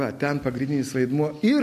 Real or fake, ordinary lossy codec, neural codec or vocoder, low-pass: real; MP3, 64 kbps; none; 14.4 kHz